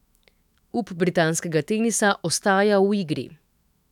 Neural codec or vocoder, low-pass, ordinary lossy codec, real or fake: autoencoder, 48 kHz, 128 numbers a frame, DAC-VAE, trained on Japanese speech; 19.8 kHz; none; fake